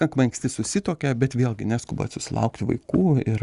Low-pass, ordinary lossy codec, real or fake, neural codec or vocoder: 10.8 kHz; Opus, 64 kbps; real; none